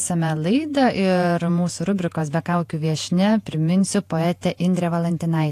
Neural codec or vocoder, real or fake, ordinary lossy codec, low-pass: vocoder, 48 kHz, 128 mel bands, Vocos; fake; AAC, 64 kbps; 14.4 kHz